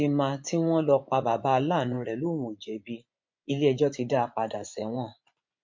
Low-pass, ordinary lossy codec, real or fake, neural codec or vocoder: 7.2 kHz; MP3, 48 kbps; fake; vocoder, 24 kHz, 100 mel bands, Vocos